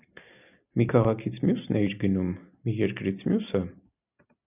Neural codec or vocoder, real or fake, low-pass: none; real; 3.6 kHz